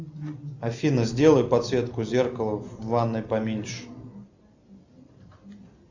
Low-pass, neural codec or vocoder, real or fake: 7.2 kHz; none; real